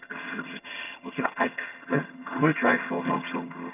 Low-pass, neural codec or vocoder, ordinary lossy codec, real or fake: 3.6 kHz; vocoder, 22.05 kHz, 80 mel bands, HiFi-GAN; none; fake